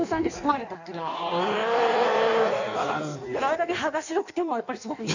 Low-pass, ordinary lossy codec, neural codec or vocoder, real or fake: 7.2 kHz; none; codec, 16 kHz in and 24 kHz out, 1.1 kbps, FireRedTTS-2 codec; fake